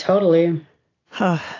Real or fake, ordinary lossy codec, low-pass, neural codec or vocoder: real; AAC, 32 kbps; 7.2 kHz; none